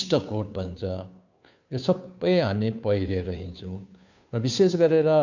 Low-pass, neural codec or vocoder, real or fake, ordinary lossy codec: 7.2 kHz; codec, 16 kHz, 2 kbps, FunCodec, trained on Chinese and English, 25 frames a second; fake; none